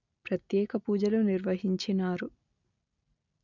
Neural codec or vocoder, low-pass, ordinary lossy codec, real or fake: none; 7.2 kHz; none; real